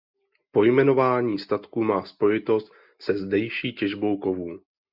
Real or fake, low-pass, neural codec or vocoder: real; 5.4 kHz; none